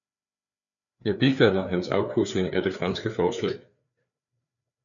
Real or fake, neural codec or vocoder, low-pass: fake; codec, 16 kHz, 4 kbps, FreqCodec, larger model; 7.2 kHz